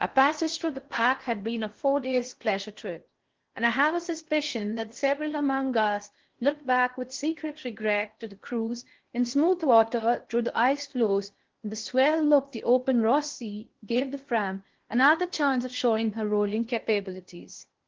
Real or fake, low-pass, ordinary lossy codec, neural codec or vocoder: fake; 7.2 kHz; Opus, 16 kbps; codec, 16 kHz in and 24 kHz out, 0.6 kbps, FocalCodec, streaming, 4096 codes